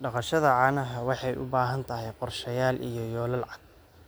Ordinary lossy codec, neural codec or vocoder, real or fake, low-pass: none; none; real; none